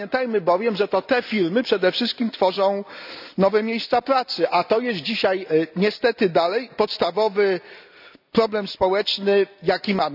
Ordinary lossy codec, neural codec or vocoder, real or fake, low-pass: none; none; real; 5.4 kHz